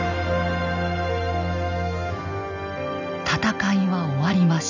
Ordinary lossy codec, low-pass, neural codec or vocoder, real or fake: none; 7.2 kHz; none; real